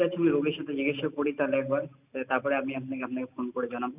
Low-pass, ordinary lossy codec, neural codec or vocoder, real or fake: 3.6 kHz; none; none; real